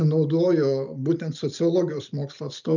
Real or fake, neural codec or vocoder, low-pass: real; none; 7.2 kHz